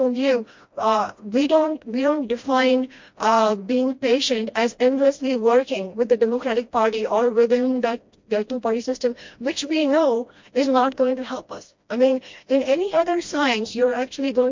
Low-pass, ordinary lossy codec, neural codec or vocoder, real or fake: 7.2 kHz; MP3, 48 kbps; codec, 16 kHz, 1 kbps, FreqCodec, smaller model; fake